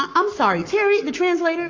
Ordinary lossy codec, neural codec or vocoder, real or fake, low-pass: AAC, 48 kbps; codec, 44.1 kHz, 7.8 kbps, Pupu-Codec; fake; 7.2 kHz